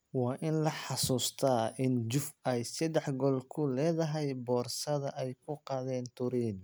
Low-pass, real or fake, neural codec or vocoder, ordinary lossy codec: none; real; none; none